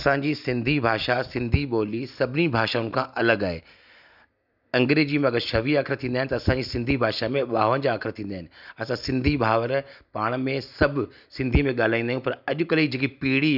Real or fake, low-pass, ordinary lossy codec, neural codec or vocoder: real; 5.4 kHz; none; none